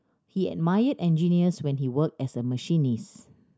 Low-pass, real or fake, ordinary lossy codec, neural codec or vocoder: none; real; none; none